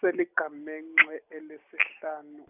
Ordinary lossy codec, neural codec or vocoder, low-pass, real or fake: none; none; 3.6 kHz; real